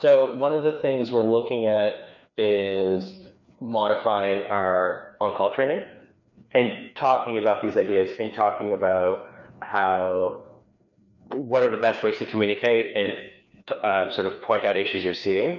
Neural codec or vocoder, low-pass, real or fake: codec, 16 kHz, 2 kbps, FreqCodec, larger model; 7.2 kHz; fake